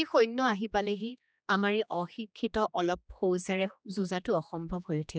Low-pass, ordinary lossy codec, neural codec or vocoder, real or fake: none; none; codec, 16 kHz, 2 kbps, X-Codec, HuBERT features, trained on general audio; fake